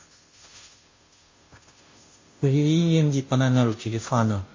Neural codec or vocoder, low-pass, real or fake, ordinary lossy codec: codec, 16 kHz, 0.5 kbps, FunCodec, trained on Chinese and English, 25 frames a second; 7.2 kHz; fake; MP3, 32 kbps